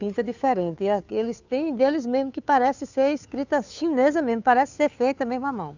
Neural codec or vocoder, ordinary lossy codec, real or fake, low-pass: codec, 16 kHz, 2 kbps, FunCodec, trained on Chinese and English, 25 frames a second; none; fake; 7.2 kHz